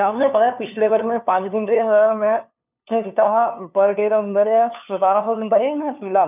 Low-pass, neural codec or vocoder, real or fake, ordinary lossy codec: 3.6 kHz; codec, 16 kHz, 2 kbps, FunCodec, trained on LibriTTS, 25 frames a second; fake; none